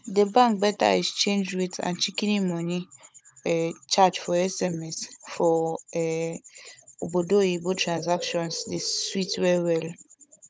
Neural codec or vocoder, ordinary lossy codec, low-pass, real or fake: codec, 16 kHz, 16 kbps, FunCodec, trained on Chinese and English, 50 frames a second; none; none; fake